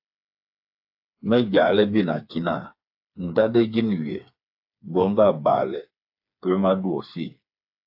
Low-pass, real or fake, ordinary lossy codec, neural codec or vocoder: 5.4 kHz; fake; AAC, 48 kbps; codec, 16 kHz, 4 kbps, FreqCodec, smaller model